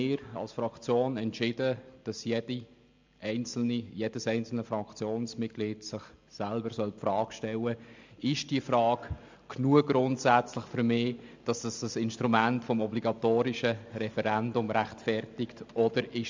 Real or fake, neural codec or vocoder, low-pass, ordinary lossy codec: real; none; 7.2 kHz; MP3, 64 kbps